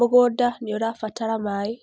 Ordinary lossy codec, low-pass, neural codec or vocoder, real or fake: none; none; none; real